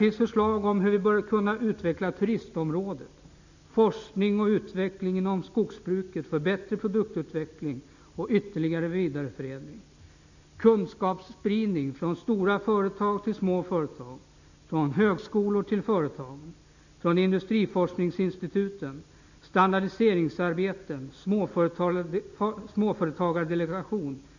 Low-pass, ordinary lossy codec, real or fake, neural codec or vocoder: 7.2 kHz; none; real; none